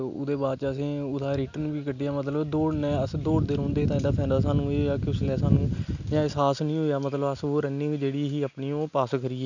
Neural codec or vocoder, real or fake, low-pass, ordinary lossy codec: none; real; 7.2 kHz; none